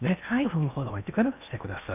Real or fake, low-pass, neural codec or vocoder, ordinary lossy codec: fake; 3.6 kHz; codec, 16 kHz in and 24 kHz out, 0.8 kbps, FocalCodec, streaming, 65536 codes; none